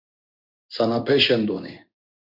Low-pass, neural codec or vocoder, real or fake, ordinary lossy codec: 5.4 kHz; codec, 16 kHz in and 24 kHz out, 1 kbps, XY-Tokenizer; fake; Opus, 64 kbps